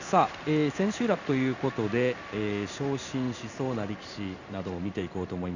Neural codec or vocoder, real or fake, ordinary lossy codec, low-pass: codec, 16 kHz in and 24 kHz out, 1 kbps, XY-Tokenizer; fake; none; 7.2 kHz